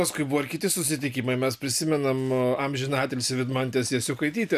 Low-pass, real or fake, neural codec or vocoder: 14.4 kHz; real; none